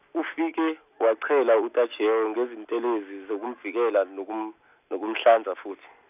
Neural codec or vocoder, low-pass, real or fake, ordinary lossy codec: none; 3.6 kHz; real; none